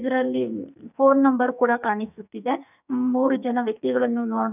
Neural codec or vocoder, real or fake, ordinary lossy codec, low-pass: codec, 44.1 kHz, 2.6 kbps, SNAC; fake; none; 3.6 kHz